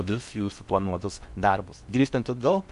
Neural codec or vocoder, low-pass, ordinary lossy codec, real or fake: codec, 16 kHz in and 24 kHz out, 0.6 kbps, FocalCodec, streaming, 4096 codes; 10.8 kHz; MP3, 64 kbps; fake